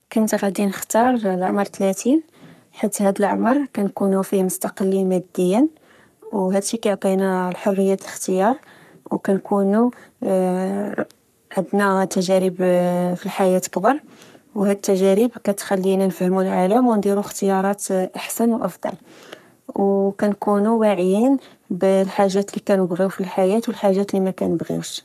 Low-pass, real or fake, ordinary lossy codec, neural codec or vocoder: 14.4 kHz; fake; none; codec, 44.1 kHz, 3.4 kbps, Pupu-Codec